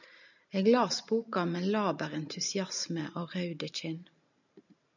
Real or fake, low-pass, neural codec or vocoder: real; 7.2 kHz; none